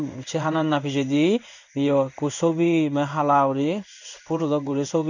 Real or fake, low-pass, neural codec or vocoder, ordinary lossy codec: fake; 7.2 kHz; codec, 16 kHz in and 24 kHz out, 1 kbps, XY-Tokenizer; none